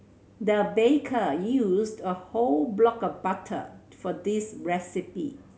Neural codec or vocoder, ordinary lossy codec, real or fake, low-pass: none; none; real; none